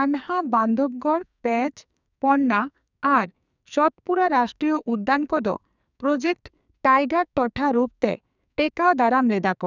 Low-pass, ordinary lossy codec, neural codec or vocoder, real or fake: 7.2 kHz; none; codec, 44.1 kHz, 2.6 kbps, SNAC; fake